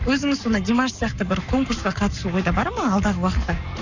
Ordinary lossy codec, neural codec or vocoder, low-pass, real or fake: none; codec, 44.1 kHz, 7.8 kbps, Pupu-Codec; 7.2 kHz; fake